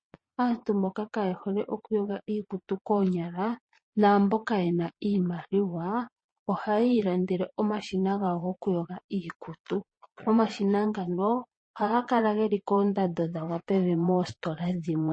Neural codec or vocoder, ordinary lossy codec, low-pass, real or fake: vocoder, 22.05 kHz, 80 mel bands, Vocos; MP3, 32 kbps; 9.9 kHz; fake